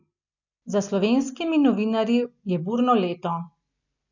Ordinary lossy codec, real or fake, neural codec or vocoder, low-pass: none; real; none; 7.2 kHz